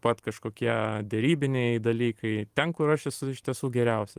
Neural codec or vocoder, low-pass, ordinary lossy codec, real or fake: vocoder, 44.1 kHz, 128 mel bands every 512 samples, BigVGAN v2; 14.4 kHz; Opus, 24 kbps; fake